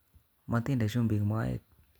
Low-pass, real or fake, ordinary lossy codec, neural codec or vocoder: none; real; none; none